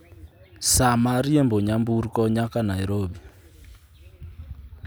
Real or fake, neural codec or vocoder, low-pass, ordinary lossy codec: real; none; none; none